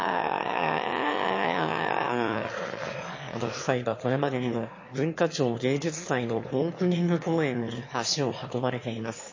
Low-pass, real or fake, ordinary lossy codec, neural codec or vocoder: 7.2 kHz; fake; MP3, 32 kbps; autoencoder, 22.05 kHz, a latent of 192 numbers a frame, VITS, trained on one speaker